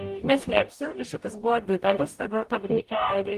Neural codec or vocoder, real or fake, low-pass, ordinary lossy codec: codec, 44.1 kHz, 0.9 kbps, DAC; fake; 14.4 kHz; Opus, 24 kbps